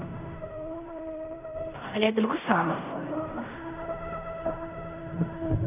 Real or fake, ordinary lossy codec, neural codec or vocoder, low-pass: fake; none; codec, 16 kHz in and 24 kHz out, 0.4 kbps, LongCat-Audio-Codec, fine tuned four codebook decoder; 3.6 kHz